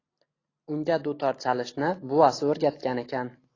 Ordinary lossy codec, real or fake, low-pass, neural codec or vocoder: AAC, 32 kbps; real; 7.2 kHz; none